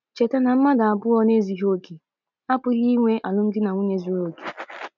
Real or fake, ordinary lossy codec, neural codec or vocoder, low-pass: real; none; none; 7.2 kHz